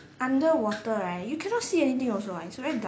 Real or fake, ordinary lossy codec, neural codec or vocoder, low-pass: real; none; none; none